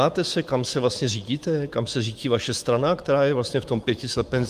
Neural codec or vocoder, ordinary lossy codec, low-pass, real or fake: autoencoder, 48 kHz, 128 numbers a frame, DAC-VAE, trained on Japanese speech; Opus, 32 kbps; 14.4 kHz; fake